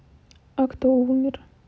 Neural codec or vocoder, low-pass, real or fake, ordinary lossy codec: none; none; real; none